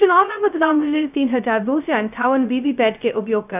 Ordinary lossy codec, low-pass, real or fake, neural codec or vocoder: none; 3.6 kHz; fake; codec, 16 kHz, 0.2 kbps, FocalCodec